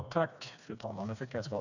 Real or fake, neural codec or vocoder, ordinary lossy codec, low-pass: fake; codec, 16 kHz, 2 kbps, FreqCodec, smaller model; none; 7.2 kHz